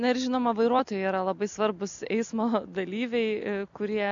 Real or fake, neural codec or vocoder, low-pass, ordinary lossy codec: real; none; 7.2 kHz; MP3, 64 kbps